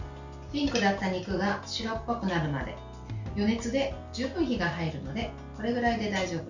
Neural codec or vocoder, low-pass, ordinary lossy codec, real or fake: none; 7.2 kHz; none; real